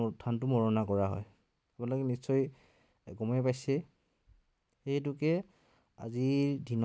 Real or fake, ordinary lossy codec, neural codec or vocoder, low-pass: real; none; none; none